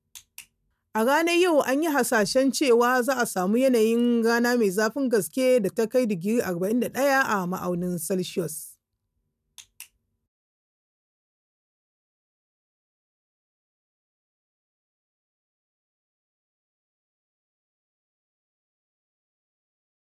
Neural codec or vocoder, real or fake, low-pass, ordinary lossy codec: none; real; 14.4 kHz; none